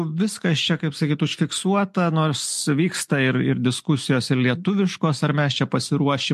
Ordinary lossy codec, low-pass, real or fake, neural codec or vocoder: MP3, 64 kbps; 14.4 kHz; real; none